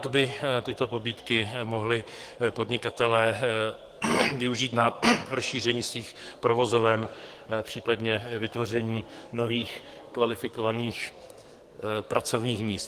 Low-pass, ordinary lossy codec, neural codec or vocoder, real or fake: 14.4 kHz; Opus, 24 kbps; codec, 44.1 kHz, 2.6 kbps, SNAC; fake